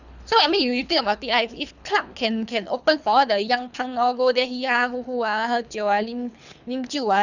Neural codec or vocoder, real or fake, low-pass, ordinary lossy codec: codec, 24 kHz, 3 kbps, HILCodec; fake; 7.2 kHz; none